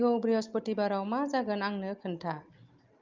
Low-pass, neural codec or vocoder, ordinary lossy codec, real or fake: 7.2 kHz; none; Opus, 24 kbps; real